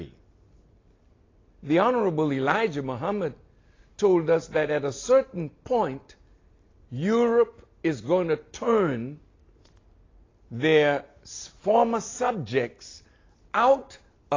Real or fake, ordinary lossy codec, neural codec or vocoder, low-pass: real; AAC, 32 kbps; none; 7.2 kHz